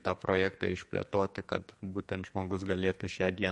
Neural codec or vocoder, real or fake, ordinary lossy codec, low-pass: codec, 44.1 kHz, 2.6 kbps, SNAC; fake; MP3, 48 kbps; 10.8 kHz